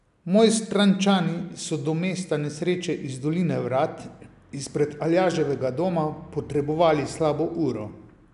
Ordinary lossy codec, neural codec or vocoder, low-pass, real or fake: none; none; 10.8 kHz; real